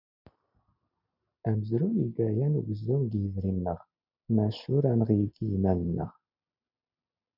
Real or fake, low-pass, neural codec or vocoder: real; 5.4 kHz; none